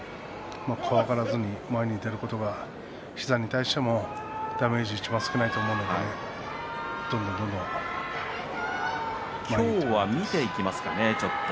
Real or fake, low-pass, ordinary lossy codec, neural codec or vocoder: real; none; none; none